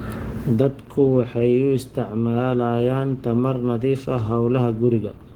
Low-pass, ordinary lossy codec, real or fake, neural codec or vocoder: 19.8 kHz; Opus, 16 kbps; fake; codec, 44.1 kHz, 7.8 kbps, Pupu-Codec